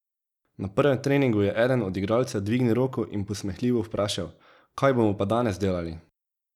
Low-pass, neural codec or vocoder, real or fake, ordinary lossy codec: 19.8 kHz; none; real; none